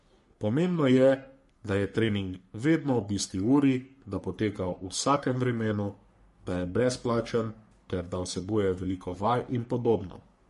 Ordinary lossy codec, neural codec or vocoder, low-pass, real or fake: MP3, 48 kbps; codec, 44.1 kHz, 3.4 kbps, Pupu-Codec; 14.4 kHz; fake